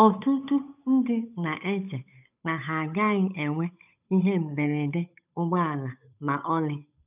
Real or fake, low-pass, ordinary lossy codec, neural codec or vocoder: fake; 3.6 kHz; none; codec, 16 kHz, 8 kbps, FunCodec, trained on Chinese and English, 25 frames a second